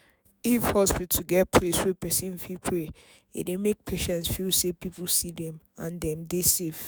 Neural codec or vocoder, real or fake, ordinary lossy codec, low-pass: autoencoder, 48 kHz, 128 numbers a frame, DAC-VAE, trained on Japanese speech; fake; none; none